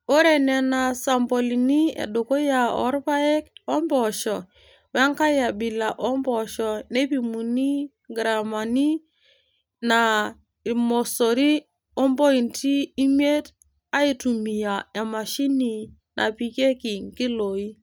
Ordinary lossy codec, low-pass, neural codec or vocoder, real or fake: none; none; none; real